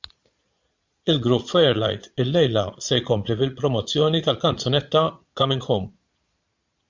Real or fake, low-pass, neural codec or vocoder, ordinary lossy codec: fake; 7.2 kHz; vocoder, 22.05 kHz, 80 mel bands, Vocos; MP3, 64 kbps